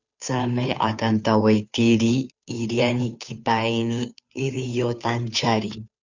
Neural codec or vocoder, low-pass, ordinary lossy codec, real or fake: codec, 16 kHz, 2 kbps, FunCodec, trained on Chinese and English, 25 frames a second; 7.2 kHz; Opus, 64 kbps; fake